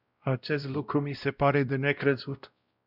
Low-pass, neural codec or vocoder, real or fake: 5.4 kHz; codec, 16 kHz, 0.5 kbps, X-Codec, WavLM features, trained on Multilingual LibriSpeech; fake